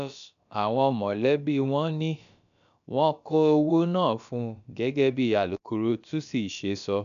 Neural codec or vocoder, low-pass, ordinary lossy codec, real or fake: codec, 16 kHz, about 1 kbps, DyCAST, with the encoder's durations; 7.2 kHz; none; fake